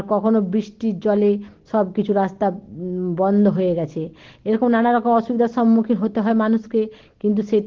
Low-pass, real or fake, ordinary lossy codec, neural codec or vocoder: 7.2 kHz; real; Opus, 16 kbps; none